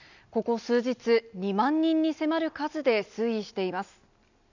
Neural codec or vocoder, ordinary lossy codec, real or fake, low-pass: none; none; real; 7.2 kHz